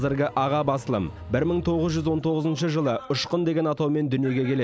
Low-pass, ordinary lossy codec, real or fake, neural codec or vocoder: none; none; real; none